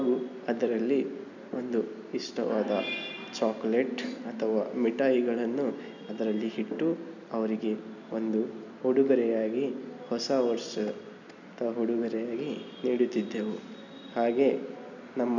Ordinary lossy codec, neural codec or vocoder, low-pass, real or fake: none; none; 7.2 kHz; real